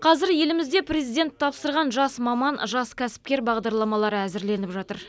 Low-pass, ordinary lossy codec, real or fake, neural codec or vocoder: none; none; real; none